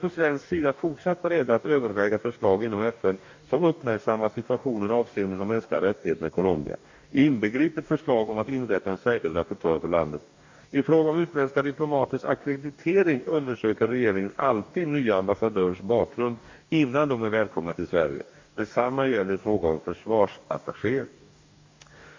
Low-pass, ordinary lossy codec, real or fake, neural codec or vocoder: 7.2 kHz; MP3, 48 kbps; fake; codec, 44.1 kHz, 2.6 kbps, DAC